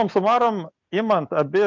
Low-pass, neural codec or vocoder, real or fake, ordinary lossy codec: 7.2 kHz; none; real; MP3, 64 kbps